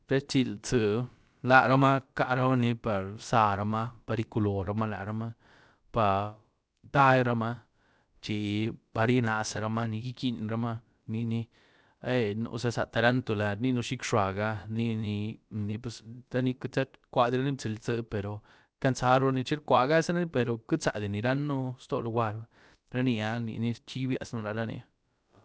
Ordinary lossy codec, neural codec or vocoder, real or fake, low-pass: none; codec, 16 kHz, about 1 kbps, DyCAST, with the encoder's durations; fake; none